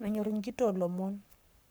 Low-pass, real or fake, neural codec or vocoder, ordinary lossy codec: none; fake; codec, 44.1 kHz, 7.8 kbps, Pupu-Codec; none